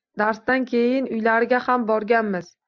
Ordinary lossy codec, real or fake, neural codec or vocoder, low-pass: MP3, 64 kbps; real; none; 7.2 kHz